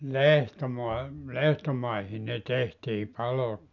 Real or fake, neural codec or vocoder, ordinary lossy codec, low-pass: real; none; none; 7.2 kHz